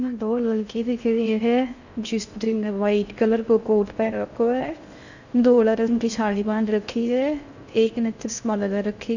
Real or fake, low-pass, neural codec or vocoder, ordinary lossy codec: fake; 7.2 kHz; codec, 16 kHz in and 24 kHz out, 0.6 kbps, FocalCodec, streaming, 4096 codes; none